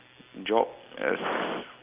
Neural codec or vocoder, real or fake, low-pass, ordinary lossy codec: none; real; 3.6 kHz; Opus, 32 kbps